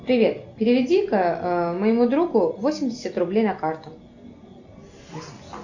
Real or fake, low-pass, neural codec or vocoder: real; 7.2 kHz; none